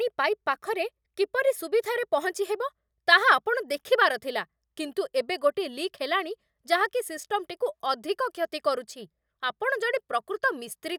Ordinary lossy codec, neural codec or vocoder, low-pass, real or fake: none; none; none; real